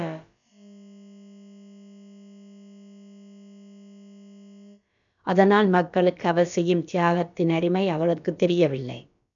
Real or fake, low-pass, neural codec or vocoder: fake; 7.2 kHz; codec, 16 kHz, about 1 kbps, DyCAST, with the encoder's durations